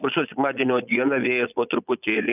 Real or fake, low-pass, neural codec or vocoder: fake; 3.6 kHz; vocoder, 22.05 kHz, 80 mel bands, Vocos